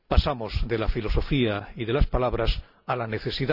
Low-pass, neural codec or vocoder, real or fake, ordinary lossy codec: 5.4 kHz; none; real; none